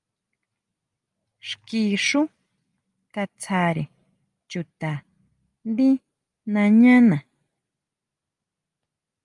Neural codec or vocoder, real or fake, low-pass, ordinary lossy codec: none; real; 10.8 kHz; Opus, 32 kbps